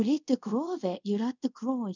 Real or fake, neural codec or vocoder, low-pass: fake; codec, 24 kHz, 0.5 kbps, DualCodec; 7.2 kHz